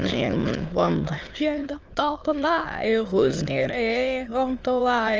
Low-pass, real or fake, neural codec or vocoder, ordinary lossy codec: 7.2 kHz; fake; autoencoder, 22.05 kHz, a latent of 192 numbers a frame, VITS, trained on many speakers; Opus, 24 kbps